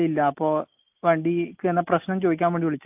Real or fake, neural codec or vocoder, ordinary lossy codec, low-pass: real; none; AAC, 32 kbps; 3.6 kHz